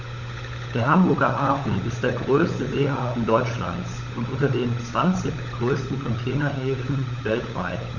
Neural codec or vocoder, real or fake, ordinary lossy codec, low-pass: codec, 16 kHz, 16 kbps, FunCodec, trained on LibriTTS, 50 frames a second; fake; none; 7.2 kHz